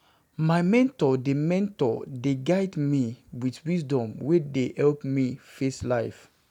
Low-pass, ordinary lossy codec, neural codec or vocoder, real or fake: 19.8 kHz; none; none; real